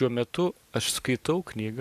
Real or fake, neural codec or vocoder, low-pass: fake; vocoder, 44.1 kHz, 128 mel bands every 512 samples, BigVGAN v2; 14.4 kHz